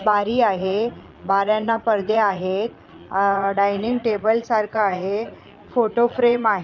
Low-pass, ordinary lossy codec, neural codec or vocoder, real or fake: 7.2 kHz; none; vocoder, 22.05 kHz, 80 mel bands, Vocos; fake